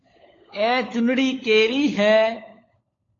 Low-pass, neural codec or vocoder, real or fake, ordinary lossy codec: 7.2 kHz; codec, 16 kHz, 16 kbps, FunCodec, trained on LibriTTS, 50 frames a second; fake; AAC, 32 kbps